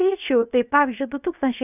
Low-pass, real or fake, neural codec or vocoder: 3.6 kHz; fake; codec, 16 kHz, 0.7 kbps, FocalCodec